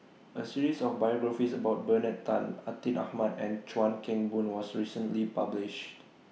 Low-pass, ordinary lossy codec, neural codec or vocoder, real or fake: none; none; none; real